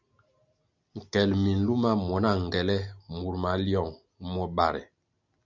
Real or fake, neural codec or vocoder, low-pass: real; none; 7.2 kHz